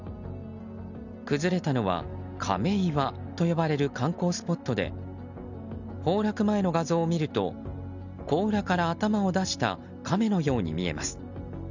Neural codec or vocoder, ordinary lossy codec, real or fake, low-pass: none; none; real; 7.2 kHz